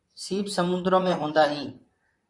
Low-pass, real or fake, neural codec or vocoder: 10.8 kHz; fake; vocoder, 44.1 kHz, 128 mel bands, Pupu-Vocoder